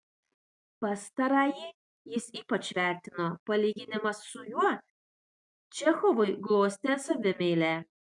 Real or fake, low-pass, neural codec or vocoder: real; 10.8 kHz; none